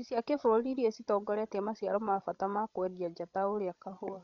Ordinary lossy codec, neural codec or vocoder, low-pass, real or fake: none; codec, 16 kHz, 16 kbps, FreqCodec, larger model; 7.2 kHz; fake